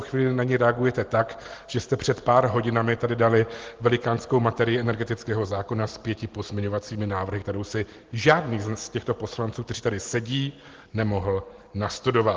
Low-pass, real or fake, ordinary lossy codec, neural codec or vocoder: 7.2 kHz; real; Opus, 16 kbps; none